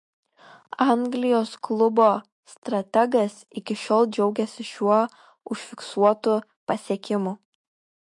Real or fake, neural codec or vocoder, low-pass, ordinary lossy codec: fake; autoencoder, 48 kHz, 128 numbers a frame, DAC-VAE, trained on Japanese speech; 10.8 kHz; MP3, 48 kbps